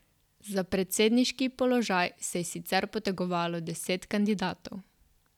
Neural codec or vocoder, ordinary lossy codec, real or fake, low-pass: none; none; real; 19.8 kHz